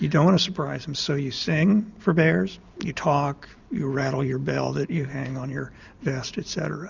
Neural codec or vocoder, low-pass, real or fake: none; 7.2 kHz; real